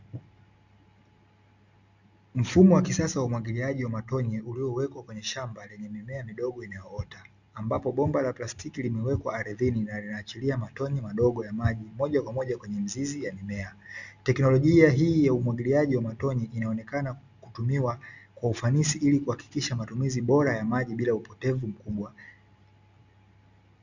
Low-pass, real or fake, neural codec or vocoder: 7.2 kHz; real; none